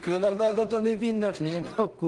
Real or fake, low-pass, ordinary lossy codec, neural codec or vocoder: fake; 10.8 kHz; Opus, 24 kbps; codec, 16 kHz in and 24 kHz out, 0.4 kbps, LongCat-Audio-Codec, two codebook decoder